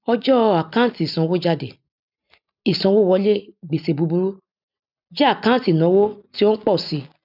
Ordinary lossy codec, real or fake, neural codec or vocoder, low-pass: none; real; none; 5.4 kHz